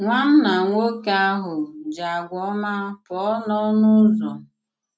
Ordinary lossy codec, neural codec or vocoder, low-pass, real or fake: none; none; none; real